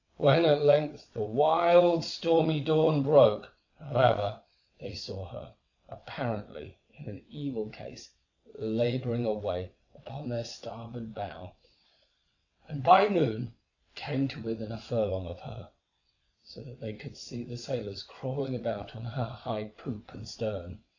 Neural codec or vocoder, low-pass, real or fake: vocoder, 22.05 kHz, 80 mel bands, WaveNeXt; 7.2 kHz; fake